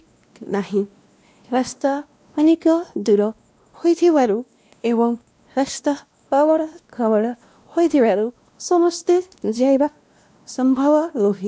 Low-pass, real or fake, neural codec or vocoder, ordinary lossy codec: none; fake; codec, 16 kHz, 1 kbps, X-Codec, WavLM features, trained on Multilingual LibriSpeech; none